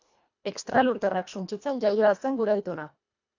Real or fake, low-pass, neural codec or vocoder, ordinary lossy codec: fake; 7.2 kHz; codec, 24 kHz, 1.5 kbps, HILCodec; AAC, 48 kbps